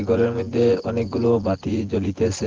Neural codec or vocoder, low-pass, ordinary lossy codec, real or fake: vocoder, 24 kHz, 100 mel bands, Vocos; 7.2 kHz; Opus, 16 kbps; fake